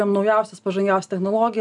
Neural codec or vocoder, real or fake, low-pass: none; real; 10.8 kHz